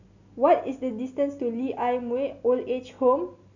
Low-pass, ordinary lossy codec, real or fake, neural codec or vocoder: 7.2 kHz; none; real; none